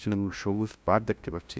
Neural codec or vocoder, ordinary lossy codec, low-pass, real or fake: codec, 16 kHz, 0.5 kbps, FunCodec, trained on LibriTTS, 25 frames a second; none; none; fake